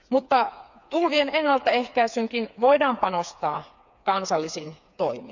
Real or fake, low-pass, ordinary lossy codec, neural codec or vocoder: fake; 7.2 kHz; none; codec, 16 kHz, 4 kbps, FreqCodec, smaller model